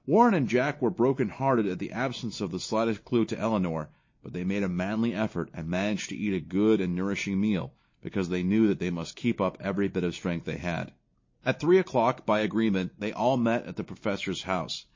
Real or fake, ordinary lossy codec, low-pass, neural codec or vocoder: real; MP3, 32 kbps; 7.2 kHz; none